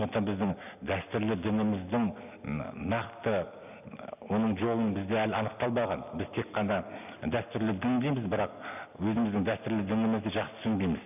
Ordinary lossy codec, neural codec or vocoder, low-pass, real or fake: none; none; 3.6 kHz; real